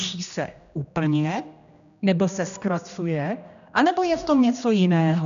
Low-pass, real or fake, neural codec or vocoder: 7.2 kHz; fake; codec, 16 kHz, 1 kbps, X-Codec, HuBERT features, trained on general audio